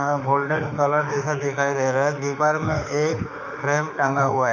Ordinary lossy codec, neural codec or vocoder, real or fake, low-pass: none; autoencoder, 48 kHz, 32 numbers a frame, DAC-VAE, trained on Japanese speech; fake; 7.2 kHz